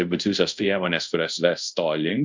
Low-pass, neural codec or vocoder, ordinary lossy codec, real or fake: 7.2 kHz; codec, 24 kHz, 0.5 kbps, DualCodec; MP3, 64 kbps; fake